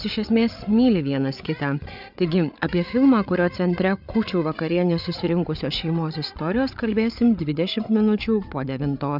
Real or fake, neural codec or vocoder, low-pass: fake; codec, 16 kHz, 8 kbps, FreqCodec, larger model; 5.4 kHz